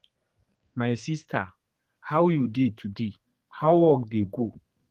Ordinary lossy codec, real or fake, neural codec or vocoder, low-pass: Opus, 24 kbps; fake; codec, 32 kHz, 1.9 kbps, SNAC; 14.4 kHz